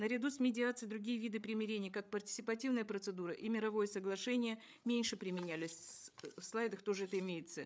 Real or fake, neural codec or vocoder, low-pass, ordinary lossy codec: fake; codec, 16 kHz, 8 kbps, FreqCodec, larger model; none; none